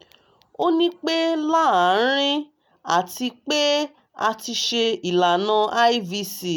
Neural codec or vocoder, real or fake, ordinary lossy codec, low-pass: none; real; none; none